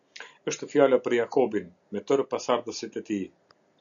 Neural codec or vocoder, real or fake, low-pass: none; real; 7.2 kHz